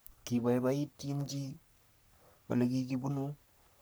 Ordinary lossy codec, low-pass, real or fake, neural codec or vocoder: none; none; fake; codec, 44.1 kHz, 3.4 kbps, Pupu-Codec